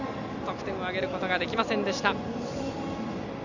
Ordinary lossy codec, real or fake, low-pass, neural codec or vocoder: none; real; 7.2 kHz; none